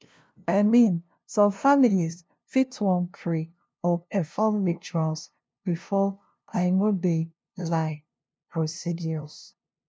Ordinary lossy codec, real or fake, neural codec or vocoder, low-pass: none; fake; codec, 16 kHz, 0.5 kbps, FunCodec, trained on LibriTTS, 25 frames a second; none